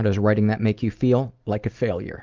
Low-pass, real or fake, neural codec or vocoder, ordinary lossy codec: 7.2 kHz; real; none; Opus, 32 kbps